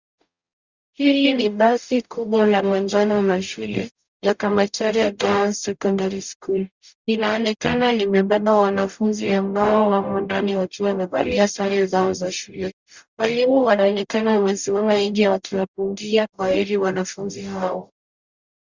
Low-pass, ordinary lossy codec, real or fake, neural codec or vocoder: 7.2 kHz; Opus, 64 kbps; fake; codec, 44.1 kHz, 0.9 kbps, DAC